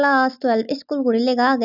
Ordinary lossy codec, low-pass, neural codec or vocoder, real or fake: none; 5.4 kHz; none; real